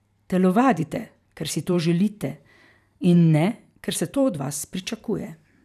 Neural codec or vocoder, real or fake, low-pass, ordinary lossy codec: vocoder, 44.1 kHz, 128 mel bands every 512 samples, BigVGAN v2; fake; 14.4 kHz; none